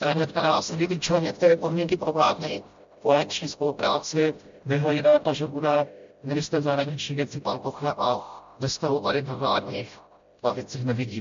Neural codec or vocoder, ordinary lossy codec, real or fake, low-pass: codec, 16 kHz, 0.5 kbps, FreqCodec, smaller model; MP3, 48 kbps; fake; 7.2 kHz